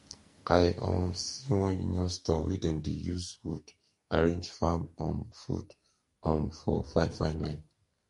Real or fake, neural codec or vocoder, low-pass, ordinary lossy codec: fake; codec, 44.1 kHz, 2.6 kbps, SNAC; 14.4 kHz; MP3, 48 kbps